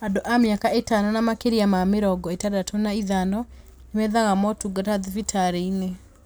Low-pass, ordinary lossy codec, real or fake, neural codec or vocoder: none; none; real; none